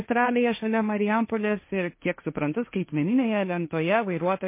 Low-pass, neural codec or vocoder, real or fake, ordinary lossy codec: 3.6 kHz; codec, 16 kHz, 1.1 kbps, Voila-Tokenizer; fake; MP3, 24 kbps